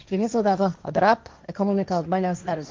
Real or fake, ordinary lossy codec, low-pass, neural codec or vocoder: fake; Opus, 32 kbps; 7.2 kHz; codec, 16 kHz, 1.1 kbps, Voila-Tokenizer